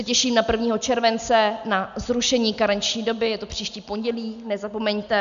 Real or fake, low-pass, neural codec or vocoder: real; 7.2 kHz; none